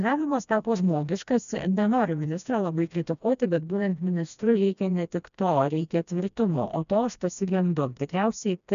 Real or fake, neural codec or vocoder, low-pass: fake; codec, 16 kHz, 1 kbps, FreqCodec, smaller model; 7.2 kHz